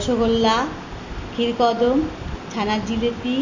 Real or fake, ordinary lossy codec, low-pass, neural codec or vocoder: real; MP3, 64 kbps; 7.2 kHz; none